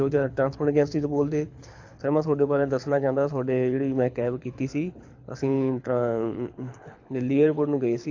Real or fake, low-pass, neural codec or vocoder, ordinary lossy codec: fake; 7.2 kHz; codec, 24 kHz, 6 kbps, HILCodec; AAC, 48 kbps